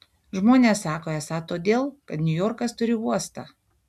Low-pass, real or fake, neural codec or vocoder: 14.4 kHz; real; none